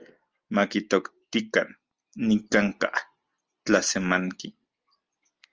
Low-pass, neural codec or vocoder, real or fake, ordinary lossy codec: 7.2 kHz; none; real; Opus, 24 kbps